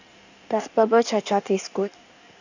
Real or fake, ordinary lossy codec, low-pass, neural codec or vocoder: fake; none; 7.2 kHz; codec, 16 kHz in and 24 kHz out, 1.1 kbps, FireRedTTS-2 codec